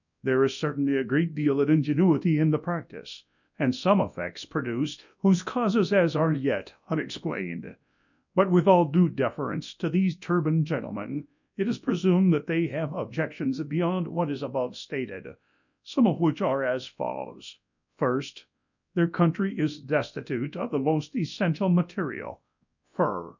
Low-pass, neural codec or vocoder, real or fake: 7.2 kHz; codec, 24 kHz, 0.9 kbps, WavTokenizer, large speech release; fake